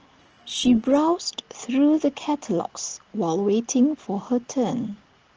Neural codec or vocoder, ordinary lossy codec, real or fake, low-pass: none; Opus, 16 kbps; real; 7.2 kHz